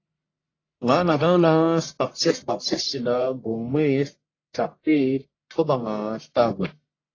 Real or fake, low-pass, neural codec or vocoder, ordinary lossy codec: fake; 7.2 kHz; codec, 44.1 kHz, 1.7 kbps, Pupu-Codec; AAC, 32 kbps